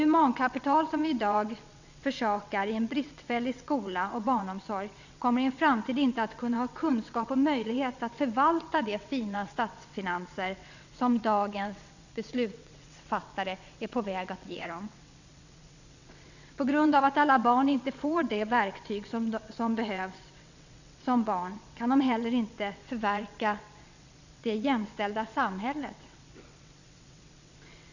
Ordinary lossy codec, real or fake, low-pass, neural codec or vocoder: none; fake; 7.2 kHz; vocoder, 22.05 kHz, 80 mel bands, WaveNeXt